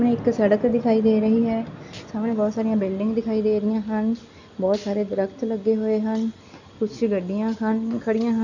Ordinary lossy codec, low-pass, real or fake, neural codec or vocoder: none; 7.2 kHz; real; none